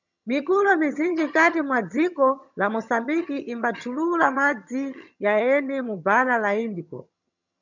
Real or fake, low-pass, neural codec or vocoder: fake; 7.2 kHz; vocoder, 22.05 kHz, 80 mel bands, HiFi-GAN